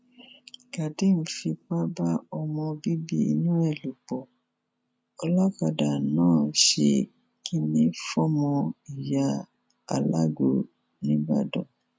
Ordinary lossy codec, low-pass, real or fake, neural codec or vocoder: none; none; real; none